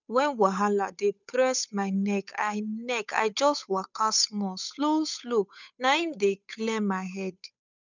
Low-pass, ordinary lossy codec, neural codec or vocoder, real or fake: 7.2 kHz; none; codec, 16 kHz, 8 kbps, FunCodec, trained on Chinese and English, 25 frames a second; fake